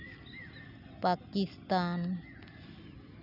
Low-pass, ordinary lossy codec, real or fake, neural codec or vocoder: 5.4 kHz; none; real; none